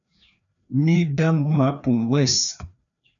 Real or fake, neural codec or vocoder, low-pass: fake; codec, 16 kHz, 2 kbps, FreqCodec, larger model; 7.2 kHz